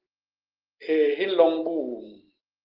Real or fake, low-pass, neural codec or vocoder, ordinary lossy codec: real; 5.4 kHz; none; Opus, 16 kbps